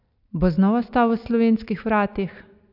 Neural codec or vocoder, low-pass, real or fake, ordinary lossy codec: none; 5.4 kHz; real; none